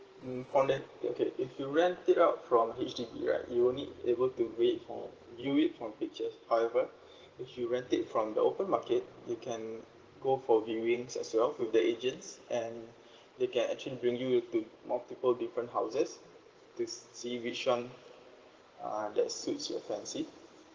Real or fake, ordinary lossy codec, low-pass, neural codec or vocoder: fake; Opus, 16 kbps; 7.2 kHz; vocoder, 44.1 kHz, 128 mel bands every 512 samples, BigVGAN v2